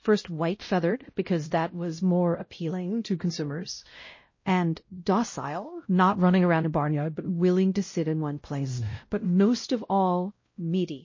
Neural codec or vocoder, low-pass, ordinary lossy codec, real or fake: codec, 16 kHz, 0.5 kbps, X-Codec, WavLM features, trained on Multilingual LibriSpeech; 7.2 kHz; MP3, 32 kbps; fake